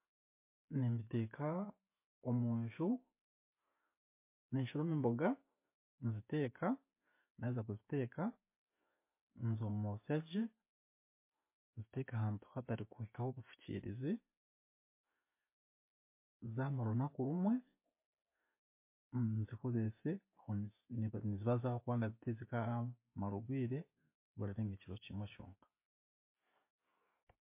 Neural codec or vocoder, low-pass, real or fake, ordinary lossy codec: none; 3.6 kHz; real; MP3, 24 kbps